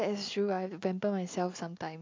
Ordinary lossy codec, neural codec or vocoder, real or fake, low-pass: MP3, 64 kbps; none; real; 7.2 kHz